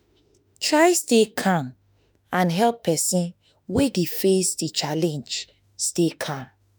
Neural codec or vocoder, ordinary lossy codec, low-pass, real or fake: autoencoder, 48 kHz, 32 numbers a frame, DAC-VAE, trained on Japanese speech; none; none; fake